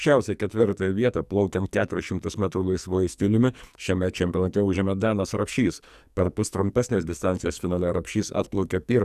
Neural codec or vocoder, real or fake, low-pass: codec, 44.1 kHz, 2.6 kbps, SNAC; fake; 14.4 kHz